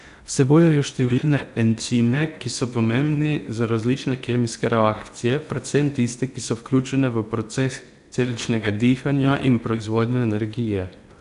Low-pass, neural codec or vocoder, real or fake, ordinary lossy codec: 10.8 kHz; codec, 16 kHz in and 24 kHz out, 0.8 kbps, FocalCodec, streaming, 65536 codes; fake; none